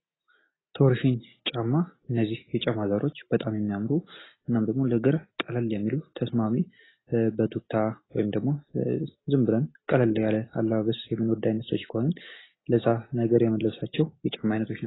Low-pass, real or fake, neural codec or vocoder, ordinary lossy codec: 7.2 kHz; real; none; AAC, 16 kbps